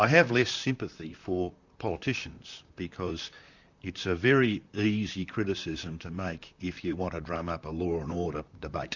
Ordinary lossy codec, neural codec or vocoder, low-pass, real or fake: Opus, 64 kbps; vocoder, 44.1 kHz, 128 mel bands, Pupu-Vocoder; 7.2 kHz; fake